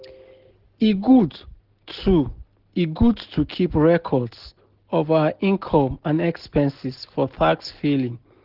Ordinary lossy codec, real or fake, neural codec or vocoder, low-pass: Opus, 16 kbps; real; none; 5.4 kHz